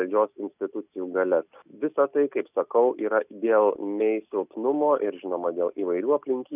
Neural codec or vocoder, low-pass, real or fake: none; 3.6 kHz; real